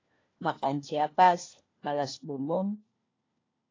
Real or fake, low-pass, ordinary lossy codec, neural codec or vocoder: fake; 7.2 kHz; AAC, 32 kbps; codec, 16 kHz, 1 kbps, FunCodec, trained on LibriTTS, 50 frames a second